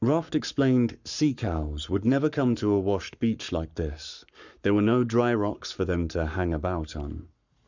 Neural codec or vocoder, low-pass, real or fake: codec, 44.1 kHz, 7.8 kbps, Pupu-Codec; 7.2 kHz; fake